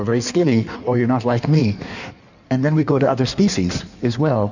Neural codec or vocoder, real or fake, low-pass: codec, 16 kHz in and 24 kHz out, 1.1 kbps, FireRedTTS-2 codec; fake; 7.2 kHz